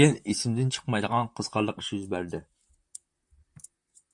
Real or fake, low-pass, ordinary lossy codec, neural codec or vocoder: fake; 10.8 kHz; MP3, 64 kbps; vocoder, 44.1 kHz, 128 mel bands, Pupu-Vocoder